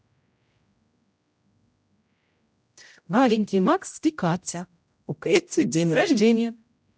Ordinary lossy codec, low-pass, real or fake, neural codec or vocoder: none; none; fake; codec, 16 kHz, 0.5 kbps, X-Codec, HuBERT features, trained on general audio